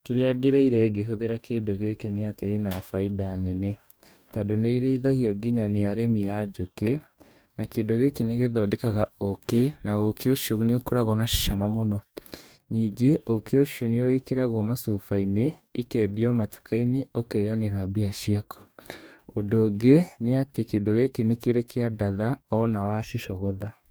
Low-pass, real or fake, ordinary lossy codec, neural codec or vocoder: none; fake; none; codec, 44.1 kHz, 2.6 kbps, DAC